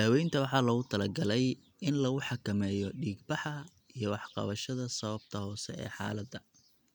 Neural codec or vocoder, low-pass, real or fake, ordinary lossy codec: none; 19.8 kHz; real; none